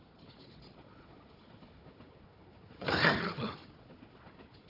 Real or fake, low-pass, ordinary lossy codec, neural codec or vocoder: fake; 5.4 kHz; none; codec, 16 kHz, 4 kbps, FunCodec, trained on Chinese and English, 50 frames a second